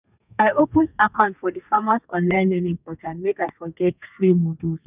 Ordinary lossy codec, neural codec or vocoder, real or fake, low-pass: none; codec, 32 kHz, 1.9 kbps, SNAC; fake; 3.6 kHz